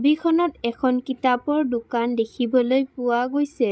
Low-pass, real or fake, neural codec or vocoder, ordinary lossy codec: none; fake; codec, 16 kHz, 16 kbps, FreqCodec, larger model; none